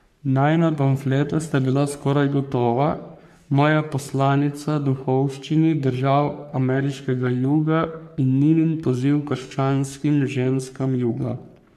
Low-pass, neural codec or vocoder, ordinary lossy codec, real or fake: 14.4 kHz; codec, 44.1 kHz, 3.4 kbps, Pupu-Codec; none; fake